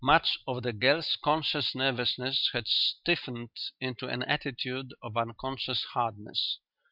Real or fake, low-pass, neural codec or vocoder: real; 5.4 kHz; none